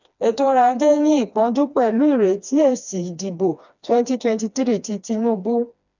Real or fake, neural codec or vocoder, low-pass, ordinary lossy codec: fake; codec, 16 kHz, 2 kbps, FreqCodec, smaller model; 7.2 kHz; none